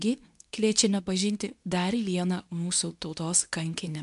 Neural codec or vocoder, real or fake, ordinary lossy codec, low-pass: codec, 24 kHz, 0.9 kbps, WavTokenizer, medium speech release version 2; fake; MP3, 96 kbps; 10.8 kHz